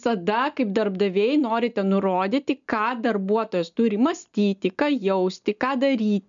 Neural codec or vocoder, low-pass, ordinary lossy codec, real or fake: none; 7.2 kHz; MP3, 96 kbps; real